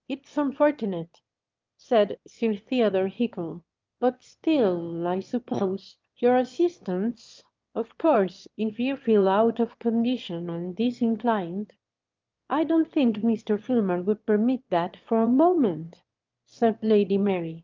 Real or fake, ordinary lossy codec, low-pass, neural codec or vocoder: fake; Opus, 24 kbps; 7.2 kHz; autoencoder, 22.05 kHz, a latent of 192 numbers a frame, VITS, trained on one speaker